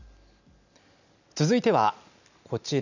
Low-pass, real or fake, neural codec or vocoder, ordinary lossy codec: 7.2 kHz; real; none; none